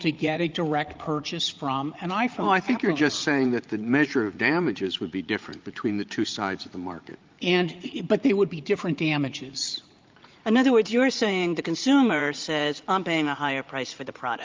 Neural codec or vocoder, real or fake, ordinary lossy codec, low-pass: vocoder, 44.1 kHz, 80 mel bands, Vocos; fake; Opus, 32 kbps; 7.2 kHz